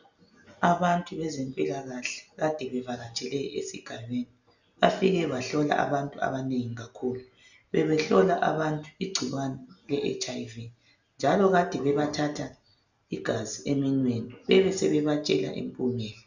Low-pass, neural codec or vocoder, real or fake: 7.2 kHz; none; real